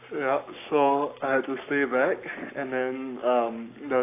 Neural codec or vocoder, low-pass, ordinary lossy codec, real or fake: codec, 44.1 kHz, 7.8 kbps, Pupu-Codec; 3.6 kHz; AAC, 24 kbps; fake